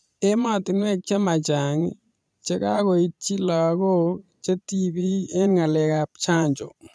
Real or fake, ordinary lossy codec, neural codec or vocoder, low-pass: fake; none; vocoder, 22.05 kHz, 80 mel bands, Vocos; none